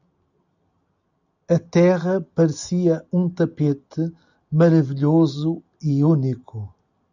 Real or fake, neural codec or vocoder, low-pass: real; none; 7.2 kHz